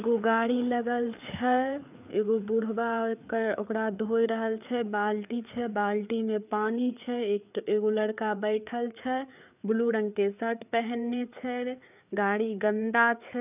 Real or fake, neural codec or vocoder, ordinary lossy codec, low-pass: fake; codec, 16 kHz, 4 kbps, FreqCodec, larger model; none; 3.6 kHz